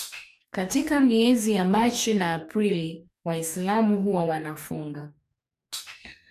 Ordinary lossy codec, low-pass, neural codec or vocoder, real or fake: none; 14.4 kHz; codec, 44.1 kHz, 2.6 kbps, DAC; fake